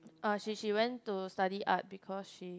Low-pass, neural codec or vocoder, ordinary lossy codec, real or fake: none; none; none; real